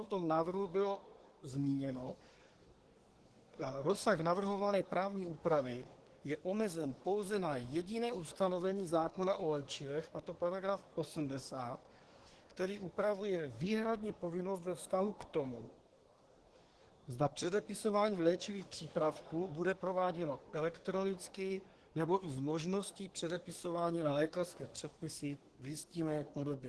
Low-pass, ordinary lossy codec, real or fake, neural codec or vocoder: 10.8 kHz; Opus, 16 kbps; fake; codec, 24 kHz, 1 kbps, SNAC